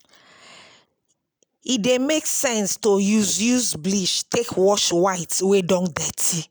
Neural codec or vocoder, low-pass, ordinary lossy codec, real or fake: none; none; none; real